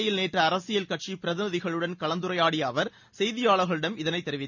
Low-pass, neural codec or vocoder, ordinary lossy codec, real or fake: 7.2 kHz; none; none; real